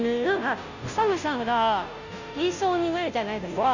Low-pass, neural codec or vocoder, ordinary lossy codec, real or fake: 7.2 kHz; codec, 16 kHz, 0.5 kbps, FunCodec, trained on Chinese and English, 25 frames a second; none; fake